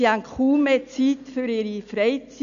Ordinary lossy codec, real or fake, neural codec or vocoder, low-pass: none; real; none; 7.2 kHz